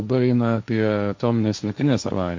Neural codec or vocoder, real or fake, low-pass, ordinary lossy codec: codec, 16 kHz, 1.1 kbps, Voila-Tokenizer; fake; 7.2 kHz; MP3, 48 kbps